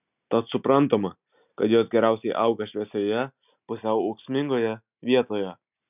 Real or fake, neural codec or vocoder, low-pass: real; none; 3.6 kHz